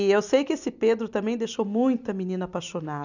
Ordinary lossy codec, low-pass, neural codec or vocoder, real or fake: none; 7.2 kHz; none; real